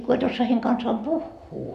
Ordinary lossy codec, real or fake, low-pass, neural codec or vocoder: MP3, 64 kbps; real; 14.4 kHz; none